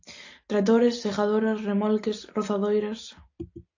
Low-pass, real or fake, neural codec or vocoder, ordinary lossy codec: 7.2 kHz; real; none; AAC, 48 kbps